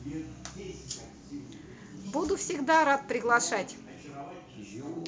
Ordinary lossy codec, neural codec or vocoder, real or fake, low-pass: none; none; real; none